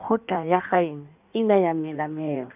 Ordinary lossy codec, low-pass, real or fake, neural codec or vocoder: none; 3.6 kHz; fake; codec, 16 kHz in and 24 kHz out, 1.1 kbps, FireRedTTS-2 codec